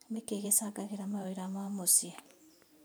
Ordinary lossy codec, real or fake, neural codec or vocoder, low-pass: none; fake; vocoder, 44.1 kHz, 128 mel bands every 512 samples, BigVGAN v2; none